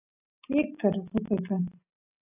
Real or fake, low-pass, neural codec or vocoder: real; 3.6 kHz; none